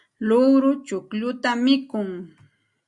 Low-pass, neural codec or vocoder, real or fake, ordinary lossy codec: 10.8 kHz; none; real; Opus, 64 kbps